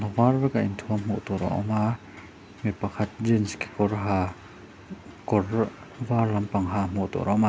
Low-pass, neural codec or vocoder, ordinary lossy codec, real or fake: none; none; none; real